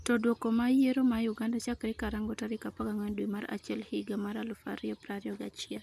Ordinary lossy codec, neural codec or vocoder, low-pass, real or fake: none; none; 14.4 kHz; real